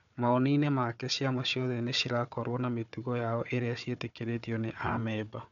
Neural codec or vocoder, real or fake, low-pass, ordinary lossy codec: codec, 16 kHz, 4 kbps, FunCodec, trained on Chinese and English, 50 frames a second; fake; 7.2 kHz; none